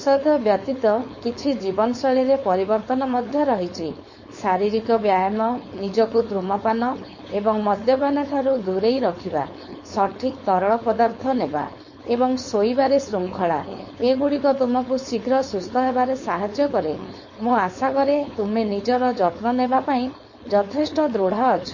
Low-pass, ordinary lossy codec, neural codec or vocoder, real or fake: 7.2 kHz; MP3, 32 kbps; codec, 16 kHz, 4.8 kbps, FACodec; fake